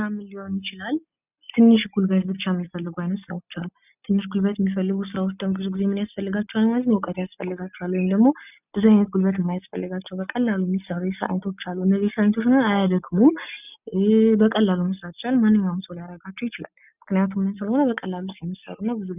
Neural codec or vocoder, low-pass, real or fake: none; 3.6 kHz; real